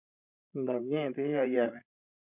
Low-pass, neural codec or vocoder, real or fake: 3.6 kHz; codec, 16 kHz, 16 kbps, FreqCodec, larger model; fake